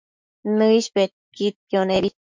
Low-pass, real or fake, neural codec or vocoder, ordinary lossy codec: 7.2 kHz; fake; autoencoder, 48 kHz, 128 numbers a frame, DAC-VAE, trained on Japanese speech; MP3, 64 kbps